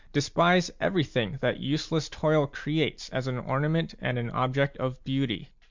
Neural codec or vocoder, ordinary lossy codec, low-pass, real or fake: none; MP3, 64 kbps; 7.2 kHz; real